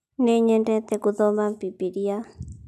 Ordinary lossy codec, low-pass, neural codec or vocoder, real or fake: none; 14.4 kHz; none; real